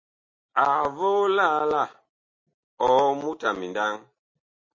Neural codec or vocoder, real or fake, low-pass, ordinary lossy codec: none; real; 7.2 kHz; MP3, 32 kbps